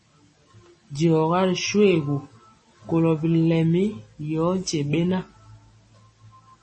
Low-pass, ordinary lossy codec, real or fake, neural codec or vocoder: 10.8 kHz; MP3, 32 kbps; real; none